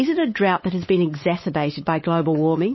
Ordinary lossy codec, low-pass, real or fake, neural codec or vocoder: MP3, 24 kbps; 7.2 kHz; fake; codec, 16 kHz, 8 kbps, FunCodec, trained on Chinese and English, 25 frames a second